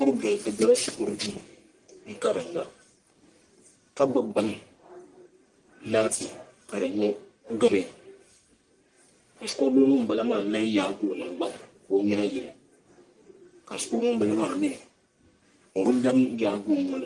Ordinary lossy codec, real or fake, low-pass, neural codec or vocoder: Opus, 24 kbps; fake; 10.8 kHz; codec, 44.1 kHz, 1.7 kbps, Pupu-Codec